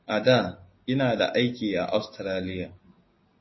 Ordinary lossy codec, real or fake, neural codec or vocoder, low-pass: MP3, 24 kbps; real; none; 7.2 kHz